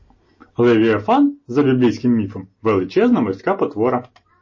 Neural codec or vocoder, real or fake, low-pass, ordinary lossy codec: none; real; 7.2 kHz; MP3, 32 kbps